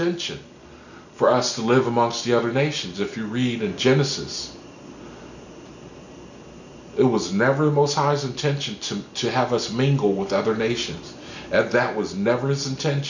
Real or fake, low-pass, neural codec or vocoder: real; 7.2 kHz; none